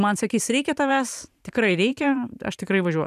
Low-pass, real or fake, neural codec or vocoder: 14.4 kHz; fake; vocoder, 44.1 kHz, 128 mel bands every 512 samples, BigVGAN v2